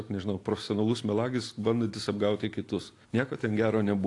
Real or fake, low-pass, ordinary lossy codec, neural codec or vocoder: real; 10.8 kHz; AAC, 48 kbps; none